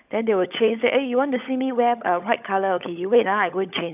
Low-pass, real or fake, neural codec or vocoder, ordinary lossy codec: 3.6 kHz; fake; codec, 16 kHz, 16 kbps, FunCodec, trained on LibriTTS, 50 frames a second; none